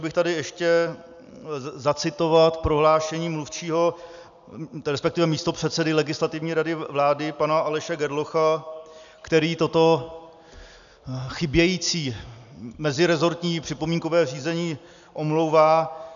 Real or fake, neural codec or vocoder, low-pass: real; none; 7.2 kHz